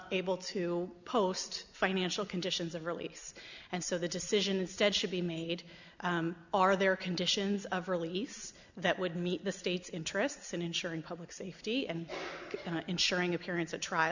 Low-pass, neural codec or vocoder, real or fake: 7.2 kHz; none; real